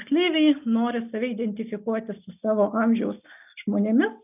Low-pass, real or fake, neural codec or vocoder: 3.6 kHz; real; none